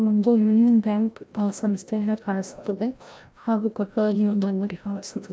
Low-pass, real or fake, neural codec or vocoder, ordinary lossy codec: none; fake; codec, 16 kHz, 0.5 kbps, FreqCodec, larger model; none